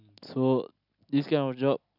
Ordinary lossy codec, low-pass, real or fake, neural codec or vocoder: none; 5.4 kHz; real; none